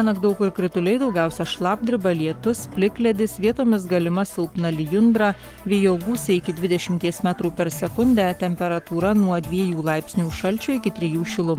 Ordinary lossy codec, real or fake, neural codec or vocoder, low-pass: Opus, 24 kbps; fake; codec, 44.1 kHz, 7.8 kbps, Pupu-Codec; 19.8 kHz